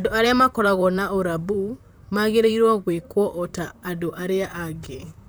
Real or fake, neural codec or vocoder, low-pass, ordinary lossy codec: fake; vocoder, 44.1 kHz, 128 mel bands, Pupu-Vocoder; none; none